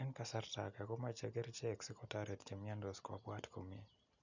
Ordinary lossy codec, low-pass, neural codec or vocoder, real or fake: none; 7.2 kHz; none; real